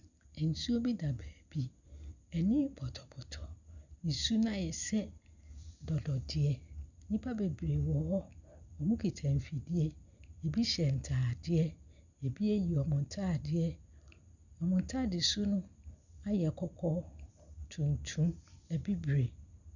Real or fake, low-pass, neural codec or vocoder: real; 7.2 kHz; none